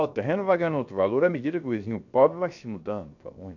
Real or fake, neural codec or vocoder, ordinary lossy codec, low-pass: fake; codec, 16 kHz, 0.7 kbps, FocalCodec; none; 7.2 kHz